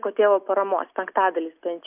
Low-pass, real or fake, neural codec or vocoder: 3.6 kHz; real; none